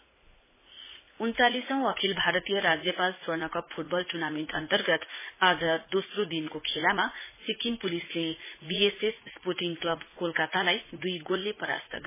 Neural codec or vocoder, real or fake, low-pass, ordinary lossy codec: vocoder, 44.1 kHz, 80 mel bands, Vocos; fake; 3.6 kHz; MP3, 16 kbps